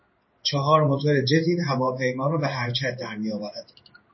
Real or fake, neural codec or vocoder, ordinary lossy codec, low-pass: fake; codec, 16 kHz in and 24 kHz out, 1 kbps, XY-Tokenizer; MP3, 24 kbps; 7.2 kHz